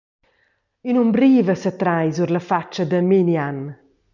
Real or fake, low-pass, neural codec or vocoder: real; 7.2 kHz; none